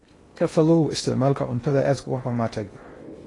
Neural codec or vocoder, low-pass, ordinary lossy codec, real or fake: codec, 16 kHz in and 24 kHz out, 0.6 kbps, FocalCodec, streaming, 2048 codes; 10.8 kHz; AAC, 32 kbps; fake